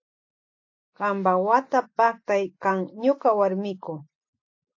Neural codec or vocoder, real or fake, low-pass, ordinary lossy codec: none; real; 7.2 kHz; AAC, 48 kbps